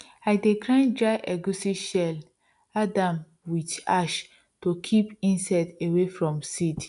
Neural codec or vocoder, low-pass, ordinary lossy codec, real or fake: none; 10.8 kHz; MP3, 96 kbps; real